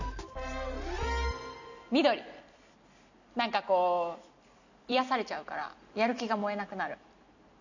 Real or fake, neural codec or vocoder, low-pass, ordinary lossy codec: real; none; 7.2 kHz; none